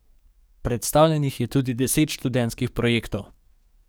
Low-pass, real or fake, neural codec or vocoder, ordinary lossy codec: none; fake; codec, 44.1 kHz, 7.8 kbps, DAC; none